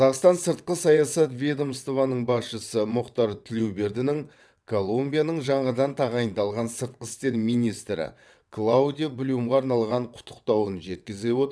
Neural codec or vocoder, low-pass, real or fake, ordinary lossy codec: vocoder, 22.05 kHz, 80 mel bands, WaveNeXt; none; fake; none